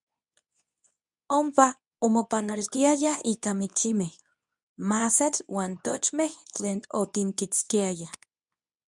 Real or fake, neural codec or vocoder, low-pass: fake; codec, 24 kHz, 0.9 kbps, WavTokenizer, medium speech release version 2; 10.8 kHz